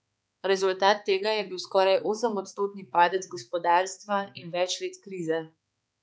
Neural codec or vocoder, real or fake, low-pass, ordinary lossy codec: codec, 16 kHz, 4 kbps, X-Codec, HuBERT features, trained on balanced general audio; fake; none; none